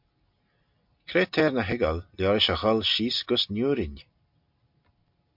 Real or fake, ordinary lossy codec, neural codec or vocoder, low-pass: real; MP3, 48 kbps; none; 5.4 kHz